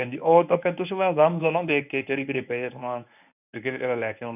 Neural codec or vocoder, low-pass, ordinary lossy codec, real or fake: codec, 24 kHz, 0.9 kbps, WavTokenizer, medium speech release version 1; 3.6 kHz; none; fake